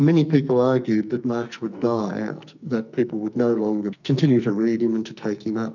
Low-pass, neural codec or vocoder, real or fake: 7.2 kHz; codec, 44.1 kHz, 2.6 kbps, SNAC; fake